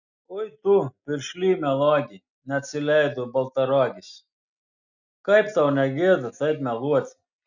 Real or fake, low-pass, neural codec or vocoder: real; 7.2 kHz; none